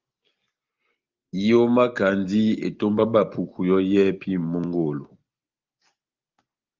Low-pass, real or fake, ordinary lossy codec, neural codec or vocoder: 7.2 kHz; real; Opus, 32 kbps; none